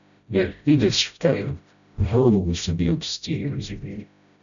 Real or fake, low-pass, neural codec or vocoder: fake; 7.2 kHz; codec, 16 kHz, 0.5 kbps, FreqCodec, smaller model